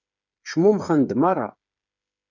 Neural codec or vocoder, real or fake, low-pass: codec, 16 kHz, 8 kbps, FreqCodec, smaller model; fake; 7.2 kHz